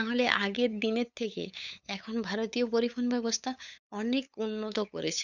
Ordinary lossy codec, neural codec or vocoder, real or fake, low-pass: none; codec, 16 kHz, 8 kbps, FunCodec, trained on LibriTTS, 25 frames a second; fake; 7.2 kHz